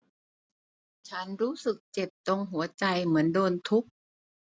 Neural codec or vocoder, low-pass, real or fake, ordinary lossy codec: none; none; real; none